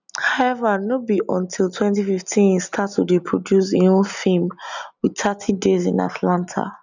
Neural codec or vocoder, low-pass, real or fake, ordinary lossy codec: none; 7.2 kHz; real; none